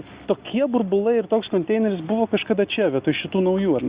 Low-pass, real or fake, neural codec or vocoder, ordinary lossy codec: 3.6 kHz; real; none; Opus, 64 kbps